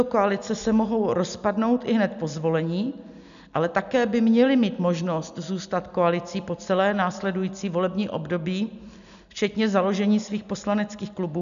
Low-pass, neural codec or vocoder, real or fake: 7.2 kHz; none; real